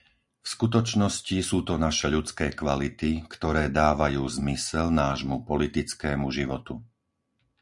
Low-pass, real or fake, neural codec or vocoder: 10.8 kHz; real; none